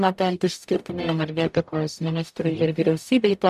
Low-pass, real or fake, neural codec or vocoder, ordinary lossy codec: 14.4 kHz; fake; codec, 44.1 kHz, 0.9 kbps, DAC; MP3, 96 kbps